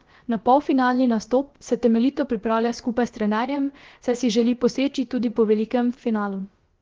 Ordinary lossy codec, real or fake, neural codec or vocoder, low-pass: Opus, 16 kbps; fake; codec, 16 kHz, about 1 kbps, DyCAST, with the encoder's durations; 7.2 kHz